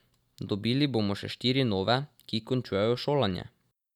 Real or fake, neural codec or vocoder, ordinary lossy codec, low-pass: real; none; none; 19.8 kHz